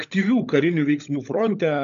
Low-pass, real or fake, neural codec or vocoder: 7.2 kHz; fake; codec, 16 kHz, 16 kbps, FunCodec, trained on LibriTTS, 50 frames a second